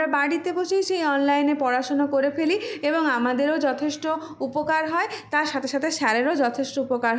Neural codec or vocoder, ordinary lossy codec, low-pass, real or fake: none; none; none; real